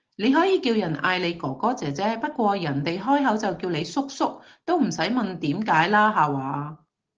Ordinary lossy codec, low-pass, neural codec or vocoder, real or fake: Opus, 16 kbps; 7.2 kHz; none; real